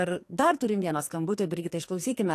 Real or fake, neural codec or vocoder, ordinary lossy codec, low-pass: fake; codec, 44.1 kHz, 2.6 kbps, SNAC; AAC, 64 kbps; 14.4 kHz